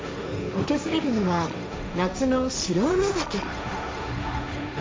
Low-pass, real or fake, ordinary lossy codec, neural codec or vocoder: none; fake; none; codec, 16 kHz, 1.1 kbps, Voila-Tokenizer